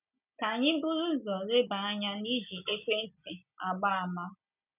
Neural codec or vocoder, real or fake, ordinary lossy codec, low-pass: none; real; none; 3.6 kHz